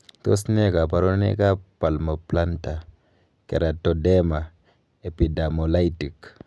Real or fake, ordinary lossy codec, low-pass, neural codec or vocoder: real; none; none; none